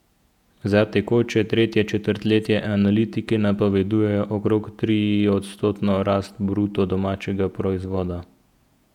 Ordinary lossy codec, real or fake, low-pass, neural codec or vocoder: none; fake; 19.8 kHz; vocoder, 48 kHz, 128 mel bands, Vocos